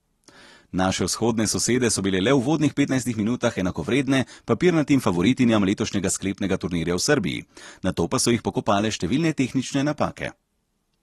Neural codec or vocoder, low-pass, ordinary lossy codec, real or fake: none; 19.8 kHz; AAC, 32 kbps; real